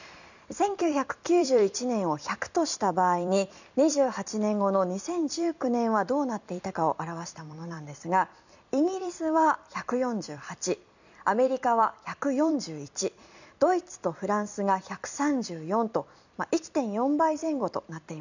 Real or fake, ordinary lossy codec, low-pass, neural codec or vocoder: fake; none; 7.2 kHz; vocoder, 44.1 kHz, 128 mel bands every 256 samples, BigVGAN v2